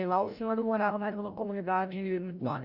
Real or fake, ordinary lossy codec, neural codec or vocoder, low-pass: fake; none; codec, 16 kHz, 0.5 kbps, FreqCodec, larger model; 5.4 kHz